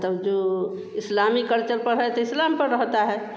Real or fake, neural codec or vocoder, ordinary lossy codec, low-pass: real; none; none; none